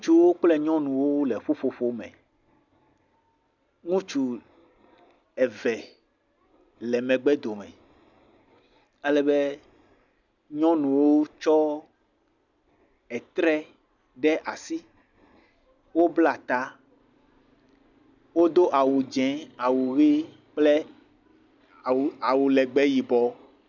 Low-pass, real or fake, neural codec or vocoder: 7.2 kHz; real; none